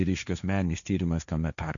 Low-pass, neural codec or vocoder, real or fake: 7.2 kHz; codec, 16 kHz, 1.1 kbps, Voila-Tokenizer; fake